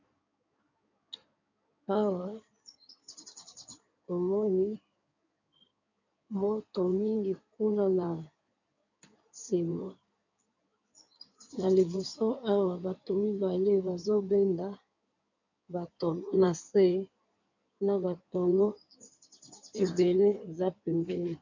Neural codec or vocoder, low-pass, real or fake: codec, 16 kHz in and 24 kHz out, 2.2 kbps, FireRedTTS-2 codec; 7.2 kHz; fake